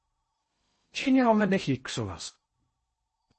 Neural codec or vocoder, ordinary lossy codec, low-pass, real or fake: codec, 16 kHz in and 24 kHz out, 0.6 kbps, FocalCodec, streaming, 2048 codes; MP3, 32 kbps; 10.8 kHz; fake